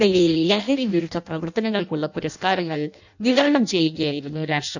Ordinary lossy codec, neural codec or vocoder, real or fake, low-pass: none; codec, 16 kHz in and 24 kHz out, 0.6 kbps, FireRedTTS-2 codec; fake; 7.2 kHz